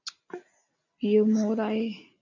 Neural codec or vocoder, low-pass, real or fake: none; 7.2 kHz; real